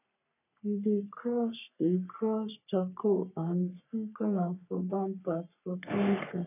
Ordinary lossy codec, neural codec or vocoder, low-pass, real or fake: none; codec, 44.1 kHz, 3.4 kbps, Pupu-Codec; 3.6 kHz; fake